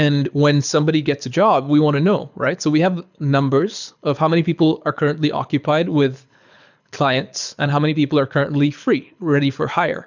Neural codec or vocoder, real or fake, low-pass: codec, 24 kHz, 6 kbps, HILCodec; fake; 7.2 kHz